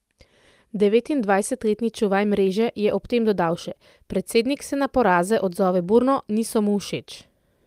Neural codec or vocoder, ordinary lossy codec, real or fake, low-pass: none; Opus, 32 kbps; real; 14.4 kHz